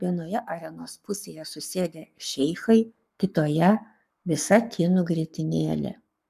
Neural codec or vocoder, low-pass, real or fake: codec, 44.1 kHz, 7.8 kbps, Pupu-Codec; 14.4 kHz; fake